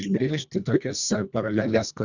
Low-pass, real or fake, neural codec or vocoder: 7.2 kHz; fake; codec, 24 kHz, 1.5 kbps, HILCodec